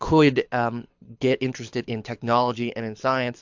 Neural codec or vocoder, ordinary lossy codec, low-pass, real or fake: codec, 24 kHz, 6 kbps, HILCodec; MP3, 64 kbps; 7.2 kHz; fake